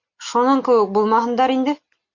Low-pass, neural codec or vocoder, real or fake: 7.2 kHz; none; real